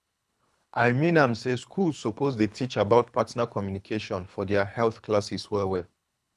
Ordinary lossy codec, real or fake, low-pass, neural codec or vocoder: none; fake; none; codec, 24 kHz, 3 kbps, HILCodec